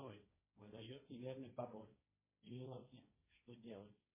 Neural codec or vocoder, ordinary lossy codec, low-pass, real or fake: codec, 16 kHz, 1.1 kbps, Voila-Tokenizer; MP3, 16 kbps; 3.6 kHz; fake